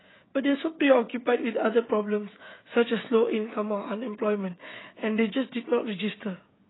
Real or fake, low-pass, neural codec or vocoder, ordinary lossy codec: fake; 7.2 kHz; codec, 16 kHz, 16 kbps, FreqCodec, smaller model; AAC, 16 kbps